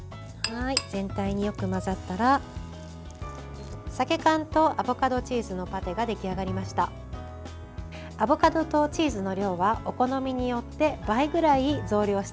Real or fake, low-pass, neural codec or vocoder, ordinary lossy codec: real; none; none; none